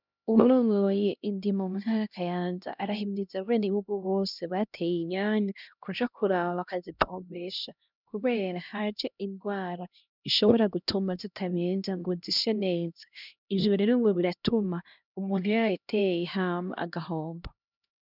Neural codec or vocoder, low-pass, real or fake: codec, 16 kHz, 1 kbps, X-Codec, HuBERT features, trained on LibriSpeech; 5.4 kHz; fake